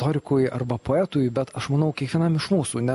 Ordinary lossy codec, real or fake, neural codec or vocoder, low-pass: MP3, 48 kbps; real; none; 14.4 kHz